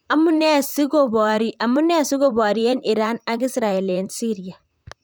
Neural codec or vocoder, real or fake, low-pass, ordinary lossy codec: vocoder, 44.1 kHz, 128 mel bands, Pupu-Vocoder; fake; none; none